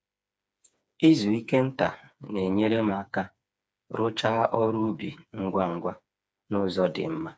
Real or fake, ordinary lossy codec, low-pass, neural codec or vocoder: fake; none; none; codec, 16 kHz, 4 kbps, FreqCodec, smaller model